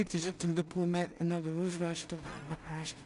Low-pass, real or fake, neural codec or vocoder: 10.8 kHz; fake; codec, 16 kHz in and 24 kHz out, 0.4 kbps, LongCat-Audio-Codec, two codebook decoder